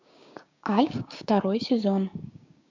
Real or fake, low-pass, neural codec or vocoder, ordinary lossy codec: fake; 7.2 kHz; codec, 16 kHz, 6 kbps, DAC; MP3, 64 kbps